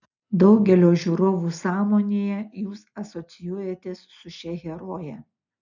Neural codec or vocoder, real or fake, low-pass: none; real; 7.2 kHz